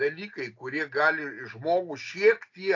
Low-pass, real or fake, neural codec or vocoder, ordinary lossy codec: 7.2 kHz; real; none; AAC, 48 kbps